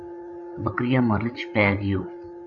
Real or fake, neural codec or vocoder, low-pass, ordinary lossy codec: fake; codec, 16 kHz, 16 kbps, FreqCodec, larger model; 7.2 kHz; AAC, 64 kbps